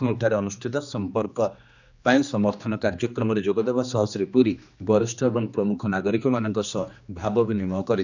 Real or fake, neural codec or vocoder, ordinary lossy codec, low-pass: fake; codec, 16 kHz, 2 kbps, X-Codec, HuBERT features, trained on general audio; none; 7.2 kHz